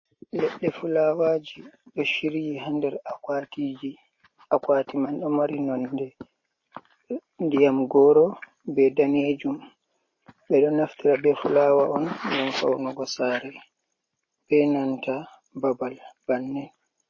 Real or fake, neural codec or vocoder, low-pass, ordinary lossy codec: real; none; 7.2 kHz; MP3, 32 kbps